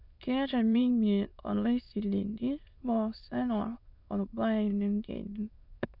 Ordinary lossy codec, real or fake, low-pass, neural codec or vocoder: none; fake; 5.4 kHz; autoencoder, 22.05 kHz, a latent of 192 numbers a frame, VITS, trained on many speakers